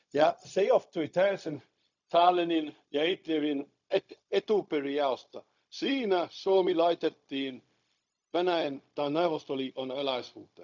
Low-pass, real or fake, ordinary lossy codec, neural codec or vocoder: 7.2 kHz; fake; none; codec, 16 kHz, 0.4 kbps, LongCat-Audio-Codec